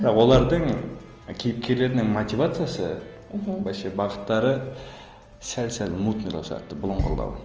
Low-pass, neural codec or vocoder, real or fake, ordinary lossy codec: 7.2 kHz; none; real; Opus, 24 kbps